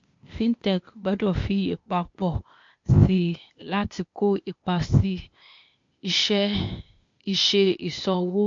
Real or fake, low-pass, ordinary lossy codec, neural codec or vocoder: fake; 7.2 kHz; MP3, 48 kbps; codec, 16 kHz, 0.8 kbps, ZipCodec